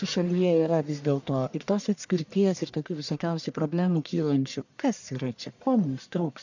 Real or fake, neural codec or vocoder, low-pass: fake; codec, 44.1 kHz, 1.7 kbps, Pupu-Codec; 7.2 kHz